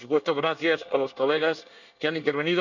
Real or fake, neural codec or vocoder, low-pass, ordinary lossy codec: fake; codec, 24 kHz, 1 kbps, SNAC; 7.2 kHz; none